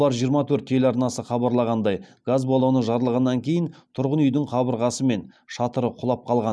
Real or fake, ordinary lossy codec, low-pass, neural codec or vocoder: real; none; none; none